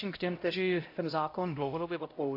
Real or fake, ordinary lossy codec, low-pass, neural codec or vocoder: fake; AAC, 32 kbps; 5.4 kHz; codec, 16 kHz, 0.5 kbps, X-Codec, HuBERT features, trained on LibriSpeech